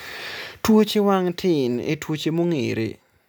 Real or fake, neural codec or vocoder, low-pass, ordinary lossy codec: real; none; none; none